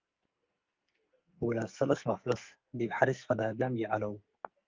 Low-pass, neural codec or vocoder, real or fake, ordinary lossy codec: 7.2 kHz; codec, 44.1 kHz, 2.6 kbps, SNAC; fake; Opus, 24 kbps